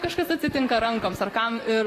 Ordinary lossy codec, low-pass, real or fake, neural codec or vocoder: AAC, 48 kbps; 14.4 kHz; fake; vocoder, 44.1 kHz, 128 mel bands, Pupu-Vocoder